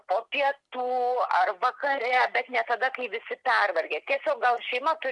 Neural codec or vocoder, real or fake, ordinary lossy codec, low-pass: none; real; MP3, 64 kbps; 10.8 kHz